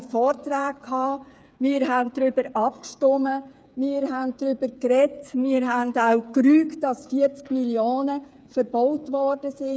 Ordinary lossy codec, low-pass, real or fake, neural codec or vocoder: none; none; fake; codec, 16 kHz, 8 kbps, FreqCodec, smaller model